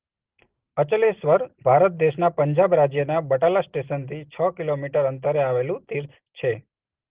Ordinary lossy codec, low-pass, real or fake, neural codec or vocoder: Opus, 16 kbps; 3.6 kHz; real; none